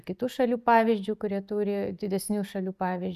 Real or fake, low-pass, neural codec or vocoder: real; 14.4 kHz; none